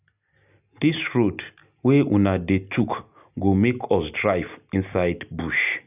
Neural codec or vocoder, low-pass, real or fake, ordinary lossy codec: none; 3.6 kHz; real; none